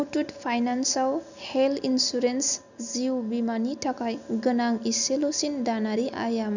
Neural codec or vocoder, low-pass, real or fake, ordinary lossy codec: none; 7.2 kHz; real; none